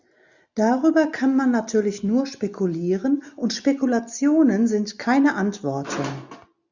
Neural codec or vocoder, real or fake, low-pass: none; real; 7.2 kHz